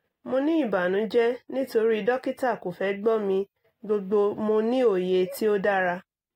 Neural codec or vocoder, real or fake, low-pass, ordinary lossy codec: none; real; 19.8 kHz; AAC, 48 kbps